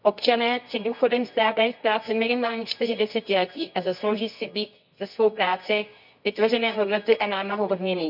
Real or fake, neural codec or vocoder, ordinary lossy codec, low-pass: fake; codec, 24 kHz, 0.9 kbps, WavTokenizer, medium music audio release; none; 5.4 kHz